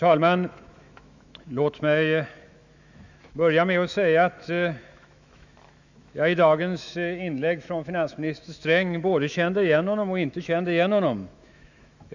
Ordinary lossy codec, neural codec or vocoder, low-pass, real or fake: none; autoencoder, 48 kHz, 128 numbers a frame, DAC-VAE, trained on Japanese speech; 7.2 kHz; fake